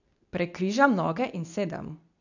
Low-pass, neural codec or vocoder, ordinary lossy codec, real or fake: 7.2 kHz; codec, 24 kHz, 0.9 kbps, WavTokenizer, medium speech release version 2; none; fake